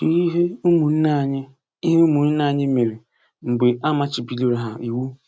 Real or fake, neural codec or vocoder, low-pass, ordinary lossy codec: real; none; none; none